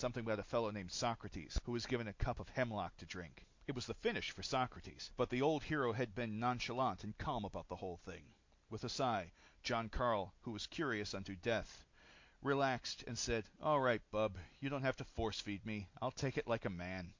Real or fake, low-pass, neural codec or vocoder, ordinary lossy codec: real; 7.2 kHz; none; MP3, 48 kbps